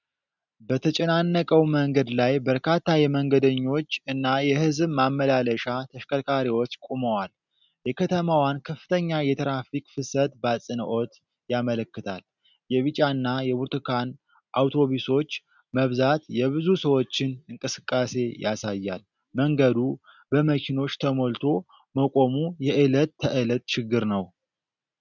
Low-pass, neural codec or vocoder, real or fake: 7.2 kHz; none; real